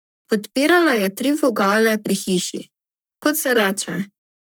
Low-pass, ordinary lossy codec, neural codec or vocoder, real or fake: none; none; codec, 44.1 kHz, 3.4 kbps, Pupu-Codec; fake